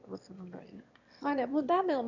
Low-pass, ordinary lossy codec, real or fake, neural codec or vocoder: 7.2 kHz; none; fake; autoencoder, 22.05 kHz, a latent of 192 numbers a frame, VITS, trained on one speaker